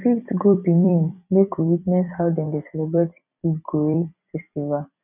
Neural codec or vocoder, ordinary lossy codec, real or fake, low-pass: vocoder, 44.1 kHz, 128 mel bands every 256 samples, BigVGAN v2; none; fake; 3.6 kHz